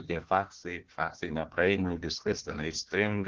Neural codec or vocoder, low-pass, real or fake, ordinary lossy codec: codec, 16 kHz, 1 kbps, FunCodec, trained on Chinese and English, 50 frames a second; 7.2 kHz; fake; Opus, 16 kbps